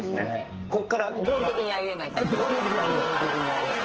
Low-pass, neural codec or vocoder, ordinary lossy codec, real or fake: 7.2 kHz; codec, 44.1 kHz, 2.6 kbps, SNAC; Opus, 16 kbps; fake